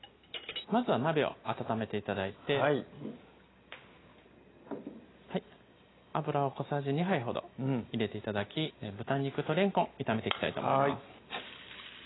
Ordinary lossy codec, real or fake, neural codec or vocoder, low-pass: AAC, 16 kbps; real; none; 7.2 kHz